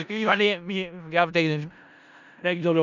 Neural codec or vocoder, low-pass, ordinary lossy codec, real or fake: codec, 16 kHz in and 24 kHz out, 0.4 kbps, LongCat-Audio-Codec, four codebook decoder; 7.2 kHz; none; fake